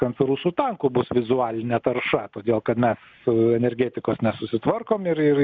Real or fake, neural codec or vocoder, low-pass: real; none; 7.2 kHz